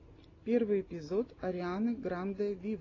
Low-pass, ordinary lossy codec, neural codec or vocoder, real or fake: 7.2 kHz; AAC, 32 kbps; none; real